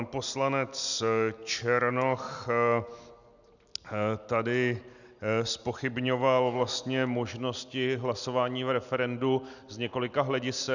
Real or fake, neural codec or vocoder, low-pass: real; none; 7.2 kHz